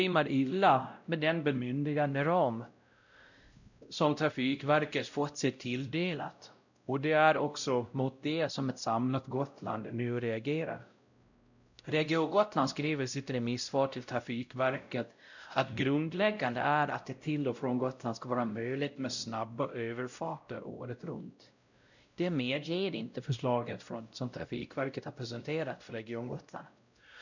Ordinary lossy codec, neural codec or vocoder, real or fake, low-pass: none; codec, 16 kHz, 0.5 kbps, X-Codec, WavLM features, trained on Multilingual LibriSpeech; fake; 7.2 kHz